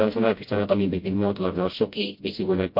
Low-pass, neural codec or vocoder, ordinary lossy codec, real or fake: 5.4 kHz; codec, 16 kHz, 0.5 kbps, FreqCodec, smaller model; MP3, 32 kbps; fake